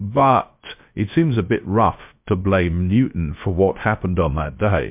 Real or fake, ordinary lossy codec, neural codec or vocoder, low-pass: fake; MP3, 32 kbps; codec, 16 kHz, 0.3 kbps, FocalCodec; 3.6 kHz